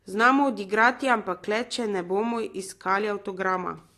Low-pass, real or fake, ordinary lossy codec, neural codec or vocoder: 14.4 kHz; real; AAC, 48 kbps; none